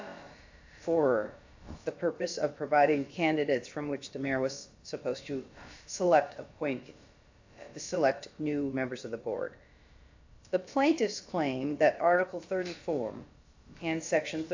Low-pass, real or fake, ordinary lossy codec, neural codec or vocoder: 7.2 kHz; fake; AAC, 48 kbps; codec, 16 kHz, about 1 kbps, DyCAST, with the encoder's durations